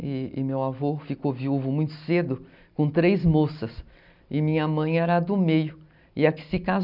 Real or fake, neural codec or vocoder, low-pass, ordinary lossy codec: real; none; 5.4 kHz; none